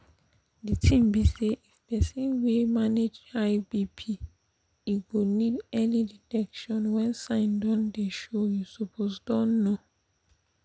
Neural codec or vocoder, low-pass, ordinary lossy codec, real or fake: none; none; none; real